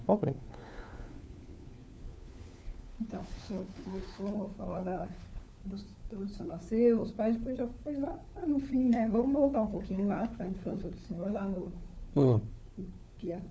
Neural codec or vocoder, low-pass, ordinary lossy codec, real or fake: codec, 16 kHz, 4 kbps, FunCodec, trained on LibriTTS, 50 frames a second; none; none; fake